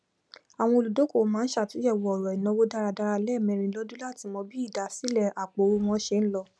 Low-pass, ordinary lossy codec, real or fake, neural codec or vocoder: none; none; real; none